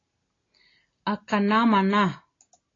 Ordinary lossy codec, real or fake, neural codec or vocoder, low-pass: AAC, 32 kbps; real; none; 7.2 kHz